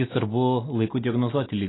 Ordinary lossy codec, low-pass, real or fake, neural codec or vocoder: AAC, 16 kbps; 7.2 kHz; real; none